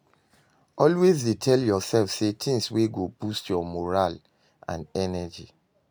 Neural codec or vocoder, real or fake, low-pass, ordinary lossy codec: none; real; none; none